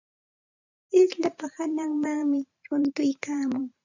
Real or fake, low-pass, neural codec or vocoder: fake; 7.2 kHz; vocoder, 44.1 kHz, 128 mel bands every 512 samples, BigVGAN v2